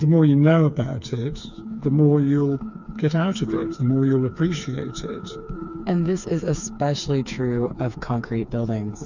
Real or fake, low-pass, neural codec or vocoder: fake; 7.2 kHz; codec, 16 kHz, 4 kbps, FreqCodec, smaller model